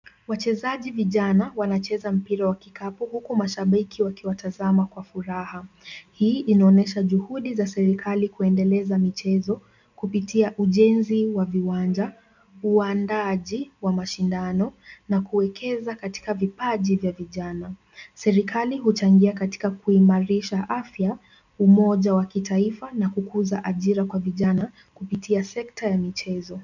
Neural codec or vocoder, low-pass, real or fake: none; 7.2 kHz; real